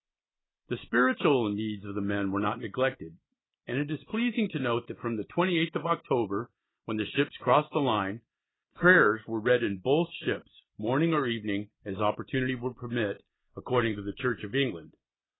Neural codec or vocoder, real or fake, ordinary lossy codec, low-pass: none; real; AAC, 16 kbps; 7.2 kHz